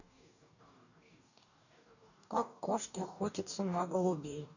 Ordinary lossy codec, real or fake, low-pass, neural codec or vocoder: none; fake; 7.2 kHz; codec, 44.1 kHz, 2.6 kbps, DAC